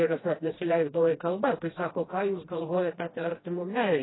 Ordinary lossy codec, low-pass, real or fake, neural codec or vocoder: AAC, 16 kbps; 7.2 kHz; fake; codec, 16 kHz, 1 kbps, FreqCodec, smaller model